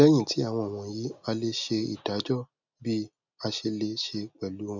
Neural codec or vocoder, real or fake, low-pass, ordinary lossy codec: none; real; 7.2 kHz; none